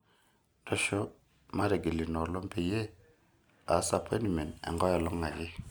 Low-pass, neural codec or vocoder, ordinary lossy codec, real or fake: none; none; none; real